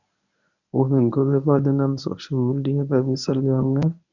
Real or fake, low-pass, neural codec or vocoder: fake; 7.2 kHz; codec, 24 kHz, 0.9 kbps, WavTokenizer, medium speech release version 1